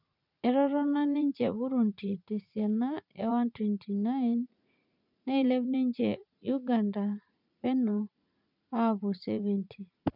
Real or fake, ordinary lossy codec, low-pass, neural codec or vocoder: fake; none; 5.4 kHz; vocoder, 44.1 kHz, 80 mel bands, Vocos